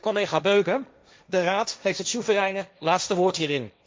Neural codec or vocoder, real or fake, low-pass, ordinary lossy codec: codec, 16 kHz, 1.1 kbps, Voila-Tokenizer; fake; none; none